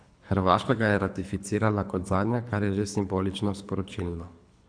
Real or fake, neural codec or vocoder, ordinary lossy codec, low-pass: fake; codec, 24 kHz, 3 kbps, HILCodec; MP3, 96 kbps; 9.9 kHz